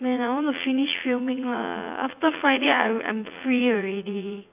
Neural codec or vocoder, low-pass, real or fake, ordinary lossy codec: vocoder, 44.1 kHz, 80 mel bands, Vocos; 3.6 kHz; fake; AAC, 32 kbps